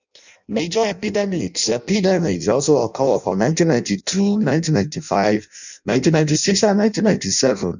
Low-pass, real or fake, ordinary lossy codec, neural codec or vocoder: 7.2 kHz; fake; none; codec, 16 kHz in and 24 kHz out, 0.6 kbps, FireRedTTS-2 codec